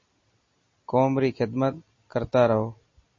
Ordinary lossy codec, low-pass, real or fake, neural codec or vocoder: MP3, 32 kbps; 7.2 kHz; real; none